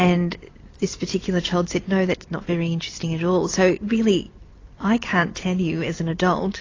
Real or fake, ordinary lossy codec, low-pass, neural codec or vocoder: fake; AAC, 32 kbps; 7.2 kHz; vocoder, 44.1 kHz, 128 mel bands every 256 samples, BigVGAN v2